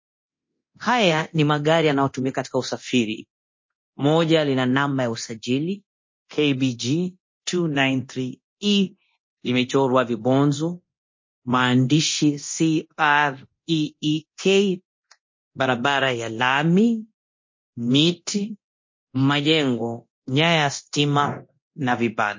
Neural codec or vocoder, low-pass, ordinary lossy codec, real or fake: codec, 24 kHz, 0.9 kbps, DualCodec; 7.2 kHz; MP3, 32 kbps; fake